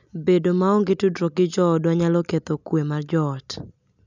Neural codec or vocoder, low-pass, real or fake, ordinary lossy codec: none; 7.2 kHz; real; none